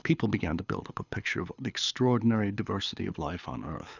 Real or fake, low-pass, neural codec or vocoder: fake; 7.2 kHz; codec, 24 kHz, 6 kbps, HILCodec